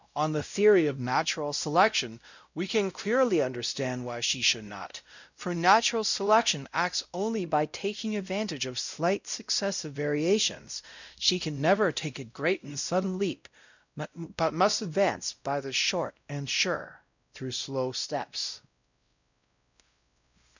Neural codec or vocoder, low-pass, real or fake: codec, 16 kHz, 0.5 kbps, X-Codec, WavLM features, trained on Multilingual LibriSpeech; 7.2 kHz; fake